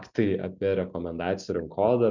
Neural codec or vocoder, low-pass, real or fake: none; 7.2 kHz; real